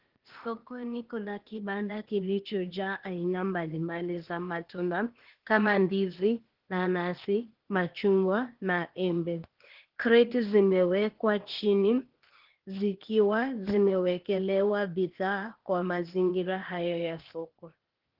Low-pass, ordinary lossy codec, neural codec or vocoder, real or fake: 5.4 kHz; Opus, 16 kbps; codec, 16 kHz, 0.8 kbps, ZipCodec; fake